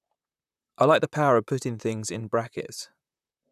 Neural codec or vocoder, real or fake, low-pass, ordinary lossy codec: vocoder, 44.1 kHz, 128 mel bands, Pupu-Vocoder; fake; 14.4 kHz; none